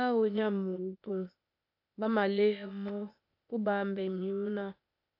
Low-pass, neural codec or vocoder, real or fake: 5.4 kHz; codec, 16 kHz, 0.8 kbps, ZipCodec; fake